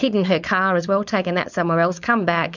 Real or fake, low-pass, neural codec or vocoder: fake; 7.2 kHz; codec, 16 kHz, 4.8 kbps, FACodec